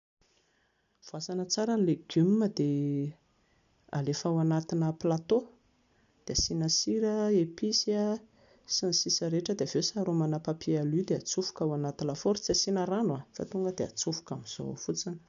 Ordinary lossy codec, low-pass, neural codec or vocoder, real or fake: none; 7.2 kHz; none; real